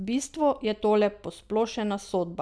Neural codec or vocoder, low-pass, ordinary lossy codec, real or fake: none; none; none; real